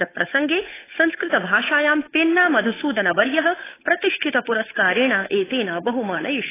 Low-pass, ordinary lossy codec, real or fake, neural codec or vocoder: 3.6 kHz; AAC, 16 kbps; fake; codec, 16 kHz, 8 kbps, FunCodec, trained on Chinese and English, 25 frames a second